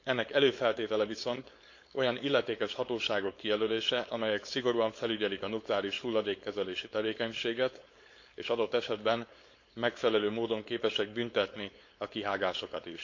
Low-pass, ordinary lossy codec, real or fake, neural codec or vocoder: 7.2 kHz; MP3, 48 kbps; fake; codec, 16 kHz, 4.8 kbps, FACodec